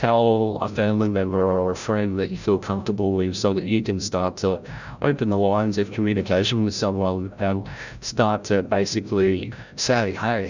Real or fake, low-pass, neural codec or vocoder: fake; 7.2 kHz; codec, 16 kHz, 0.5 kbps, FreqCodec, larger model